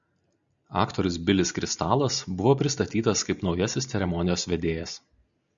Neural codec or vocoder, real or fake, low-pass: none; real; 7.2 kHz